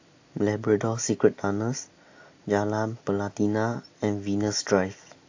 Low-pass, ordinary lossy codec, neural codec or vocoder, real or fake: 7.2 kHz; AAC, 48 kbps; none; real